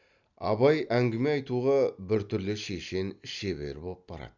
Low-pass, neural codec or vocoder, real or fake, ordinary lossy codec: 7.2 kHz; none; real; none